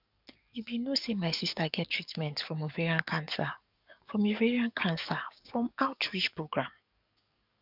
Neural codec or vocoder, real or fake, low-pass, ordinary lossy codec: codec, 44.1 kHz, 7.8 kbps, DAC; fake; 5.4 kHz; none